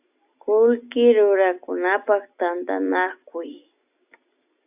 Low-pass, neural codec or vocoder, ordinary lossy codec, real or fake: 3.6 kHz; none; AAC, 32 kbps; real